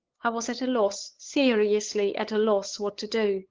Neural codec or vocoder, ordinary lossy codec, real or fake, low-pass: codec, 16 kHz, 8 kbps, FunCodec, trained on LibriTTS, 25 frames a second; Opus, 16 kbps; fake; 7.2 kHz